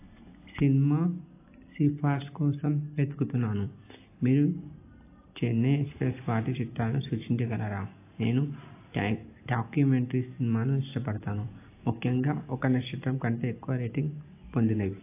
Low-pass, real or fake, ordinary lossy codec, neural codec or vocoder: 3.6 kHz; real; AAC, 24 kbps; none